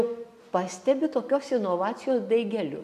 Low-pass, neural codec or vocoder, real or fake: 14.4 kHz; none; real